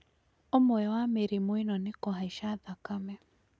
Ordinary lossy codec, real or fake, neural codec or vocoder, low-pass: none; real; none; none